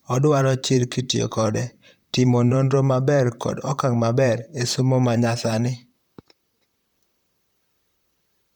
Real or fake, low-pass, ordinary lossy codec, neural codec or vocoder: fake; 19.8 kHz; none; vocoder, 44.1 kHz, 128 mel bands, Pupu-Vocoder